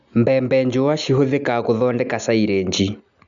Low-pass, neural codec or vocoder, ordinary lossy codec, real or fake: 7.2 kHz; none; none; real